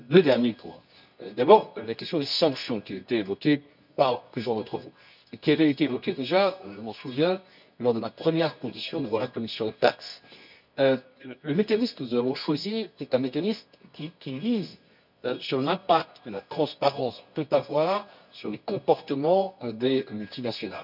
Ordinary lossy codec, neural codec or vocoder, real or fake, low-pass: none; codec, 24 kHz, 0.9 kbps, WavTokenizer, medium music audio release; fake; 5.4 kHz